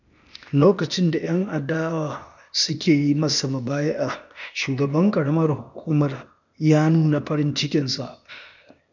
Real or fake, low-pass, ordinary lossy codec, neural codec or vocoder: fake; 7.2 kHz; none; codec, 16 kHz, 0.8 kbps, ZipCodec